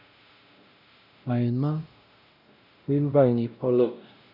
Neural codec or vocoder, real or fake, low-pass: codec, 16 kHz, 0.5 kbps, X-Codec, WavLM features, trained on Multilingual LibriSpeech; fake; 5.4 kHz